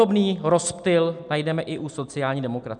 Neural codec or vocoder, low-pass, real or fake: none; 9.9 kHz; real